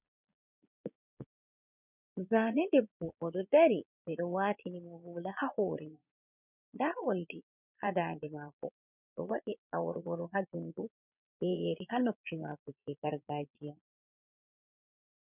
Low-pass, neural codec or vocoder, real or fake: 3.6 kHz; vocoder, 22.05 kHz, 80 mel bands, Vocos; fake